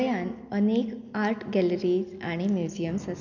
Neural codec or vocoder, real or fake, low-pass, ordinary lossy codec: none; real; 7.2 kHz; none